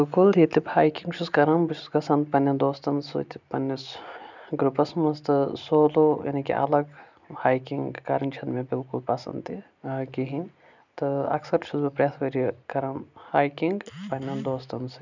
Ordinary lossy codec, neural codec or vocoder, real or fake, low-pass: none; none; real; 7.2 kHz